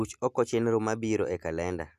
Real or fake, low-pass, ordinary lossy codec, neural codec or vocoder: real; 14.4 kHz; none; none